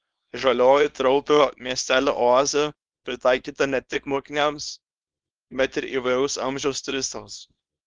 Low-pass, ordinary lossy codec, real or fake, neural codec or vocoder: 9.9 kHz; Opus, 32 kbps; fake; codec, 24 kHz, 0.9 kbps, WavTokenizer, small release